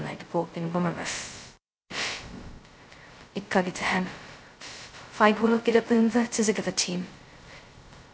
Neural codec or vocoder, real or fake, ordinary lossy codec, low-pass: codec, 16 kHz, 0.2 kbps, FocalCodec; fake; none; none